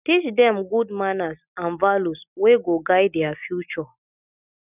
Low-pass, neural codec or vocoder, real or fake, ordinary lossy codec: 3.6 kHz; none; real; none